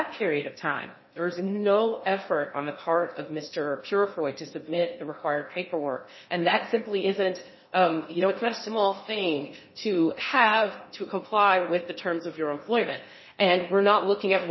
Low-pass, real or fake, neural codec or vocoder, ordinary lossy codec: 7.2 kHz; fake; codec, 16 kHz in and 24 kHz out, 0.8 kbps, FocalCodec, streaming, 65536 codes; MP3, 24 kbps